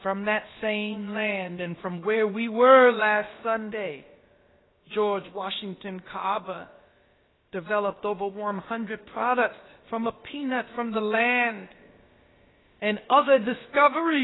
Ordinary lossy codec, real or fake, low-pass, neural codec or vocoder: AAC, 16 kbps; fake; 7.2 kHz; codec, 16 kHz, about 1 kbps, DyCAST, with the encoder's durations